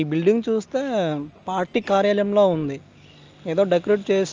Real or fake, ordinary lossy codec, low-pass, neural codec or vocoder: real; Opus, 24 kbps; 7.2 kHz; none